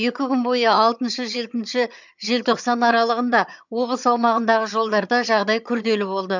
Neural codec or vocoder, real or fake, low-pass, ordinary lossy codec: vocoder, 22.05 kHz, 80 mel bands, HiFi-GAN; fake; 7.2 kHz; none